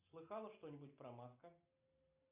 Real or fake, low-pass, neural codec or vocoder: real; 3.6 kHz; none